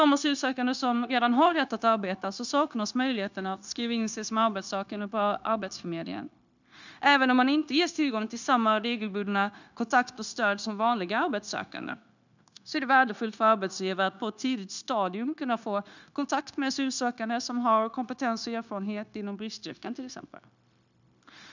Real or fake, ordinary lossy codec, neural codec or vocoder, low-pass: fake; none; codec, 16 kHz, 0.9 kbps, LongCat-Audio-Codec; 7.2 kHz